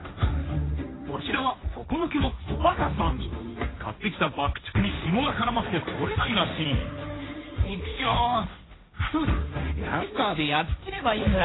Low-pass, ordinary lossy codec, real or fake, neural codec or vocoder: 7.2 kHz; AAC, 16 kbps; fake; codec, 16 kHz, 1.1 kbps, Voila-Tokenizer